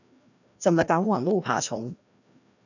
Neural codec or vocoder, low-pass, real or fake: codec, 16 kHz, 1 kbps, FreqCodec, larger model; 7.2 kHz; fake